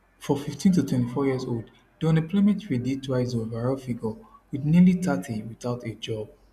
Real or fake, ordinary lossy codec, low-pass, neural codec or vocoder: real; none; 14.4 kHz; none